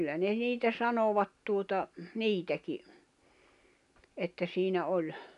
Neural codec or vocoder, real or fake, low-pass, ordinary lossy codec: none; real; 10.8 kHz; none